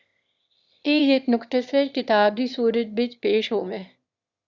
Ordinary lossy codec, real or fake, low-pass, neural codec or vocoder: Opus, 64 kbps; fake; 7.2 kHz; autoencoder, 22.05 kHz, a latent of 192 numbers a frame, VITS, trained on one speaker